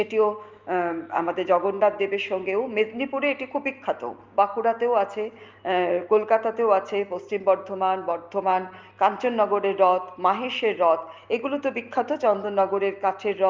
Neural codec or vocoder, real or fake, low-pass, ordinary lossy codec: none; real; 7.2 kHz; Opus, 24 kbps